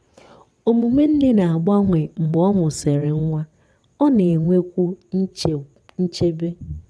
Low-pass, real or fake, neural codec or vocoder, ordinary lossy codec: none; fake; vocoder, 22.05 kHz, 80 mel bands, WaveNeXt; none